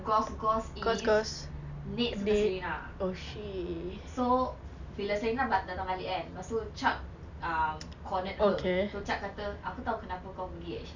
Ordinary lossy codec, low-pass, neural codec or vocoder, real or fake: none; 7.2 kHz; none; real